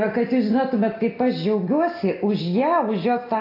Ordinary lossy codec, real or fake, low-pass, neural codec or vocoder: AAC, 24 kbps; real; 5.4 kHz; none